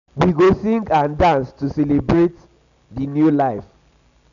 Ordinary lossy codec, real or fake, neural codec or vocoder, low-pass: none; real; none; 7.2 kHz